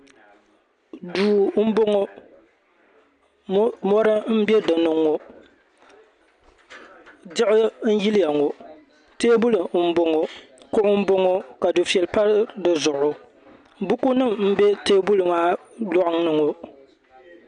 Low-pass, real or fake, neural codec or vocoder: 9.9 kHz; real; none